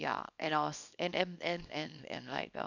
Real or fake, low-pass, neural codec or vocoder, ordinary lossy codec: fake; 7.2 kHz; codec, 24 kHz, 0.9 kbps, WavTokenizer, small release; none